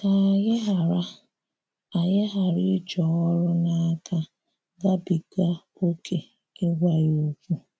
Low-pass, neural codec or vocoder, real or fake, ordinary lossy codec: none; none; real; none